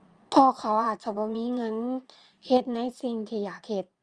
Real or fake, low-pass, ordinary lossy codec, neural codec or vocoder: fake; 9.9 kHz; Opus, 32 kbps; vocoder, 22.05 kHz, 80 mel bands, Vocos